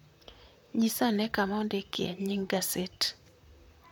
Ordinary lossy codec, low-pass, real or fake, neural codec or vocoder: none; none; fake; vocoder, 44.1 kHz, 128 mel bands, Pupu-Vocoder